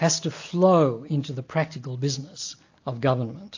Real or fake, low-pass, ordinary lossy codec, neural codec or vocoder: real; 7.2 kHz; AAC, 48 kbps; none